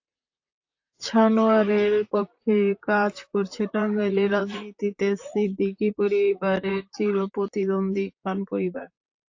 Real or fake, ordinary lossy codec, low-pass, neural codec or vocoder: fake; AAC, 48 kbps; 7.2 kHz; vocoder, 44.1 kHz, 128 mel bands, Pupu-Vocoder